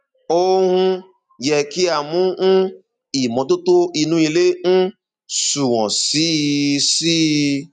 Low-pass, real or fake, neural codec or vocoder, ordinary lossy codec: 10.8 kHz; real; none; none